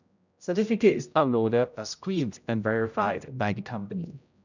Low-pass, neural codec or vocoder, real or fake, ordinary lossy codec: 7.2 kHz; codec, 16 kHz, 0.5 kbps, X-Codec, HuBERT features, trained on general audio; fake; none